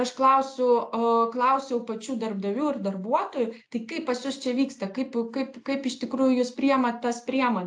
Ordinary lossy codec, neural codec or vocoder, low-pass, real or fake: AAC, 64 kbps; none; 9.9 kHz; real